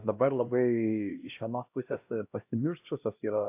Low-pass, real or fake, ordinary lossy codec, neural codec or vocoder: 3.6 kHz; fake; AAC, 32 kbps; codec, 16 kHz, 1 kbps, X-Codec, HuBERT features, trained on LibriSpeech